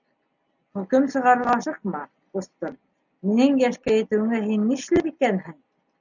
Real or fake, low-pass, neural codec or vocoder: real; 7.2 kHz; none